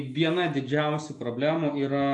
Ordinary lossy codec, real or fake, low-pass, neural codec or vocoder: AAC, 64 kbps; real; 10.8 kHz; none